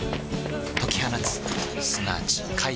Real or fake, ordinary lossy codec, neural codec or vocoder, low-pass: real; none; none; none